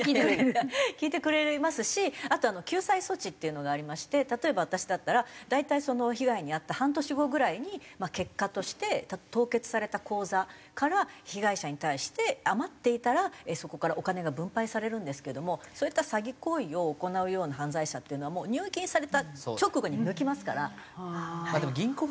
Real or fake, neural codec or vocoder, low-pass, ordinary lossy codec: real; none; none; none